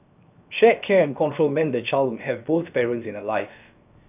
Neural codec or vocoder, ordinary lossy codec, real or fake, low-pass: codec, 16 kHz, 0.7 kbps, FocalCodec; none; fake; 3.6 kHz